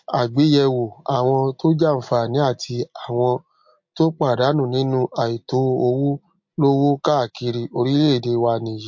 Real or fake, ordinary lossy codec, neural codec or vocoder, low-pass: real; MP3, 48 kbps; none; 7.2 kHz